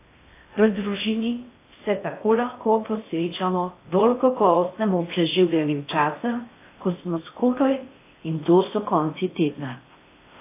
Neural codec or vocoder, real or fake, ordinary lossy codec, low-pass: codec, 16 kHz in and 24 kHz out, 0.6 kbps, FocalCodec, streaming, 2048 codes; fake; AAC, 24 kbps; 3.6 kHz